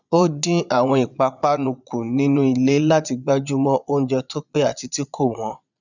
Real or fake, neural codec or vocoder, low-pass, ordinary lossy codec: fake; vocoder, 22.05 kHz, 80 mel bands, Vocos; 7.2 kHz; none